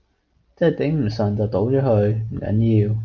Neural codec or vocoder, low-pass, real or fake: none; 7.2 kHz; real